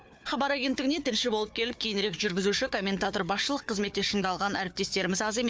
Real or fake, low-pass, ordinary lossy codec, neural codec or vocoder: fake; none; none; codec, 16 kHz, 4 kbps, FunCodec, trained on Chinese and English, 50 frames a second